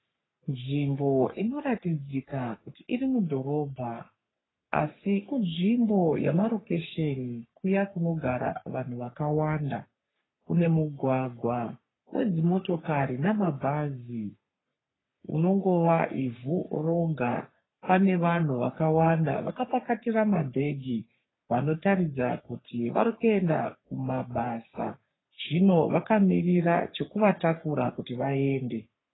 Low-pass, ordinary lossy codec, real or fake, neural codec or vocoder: 7.2 kHz; AAC, 16 kbps; fake; codec, 44.1 kHz, 3.4 kbps, Pupu-Codec